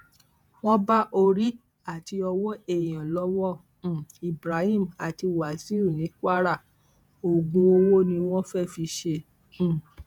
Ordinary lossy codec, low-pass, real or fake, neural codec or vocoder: none; 19.8 kHz; fake; vocoder, 48 kHz, 128 mel bands, Vocos